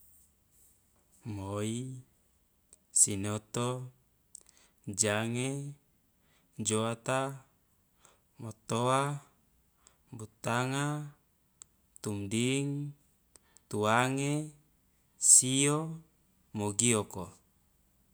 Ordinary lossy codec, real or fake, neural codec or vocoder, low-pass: none; real; none; none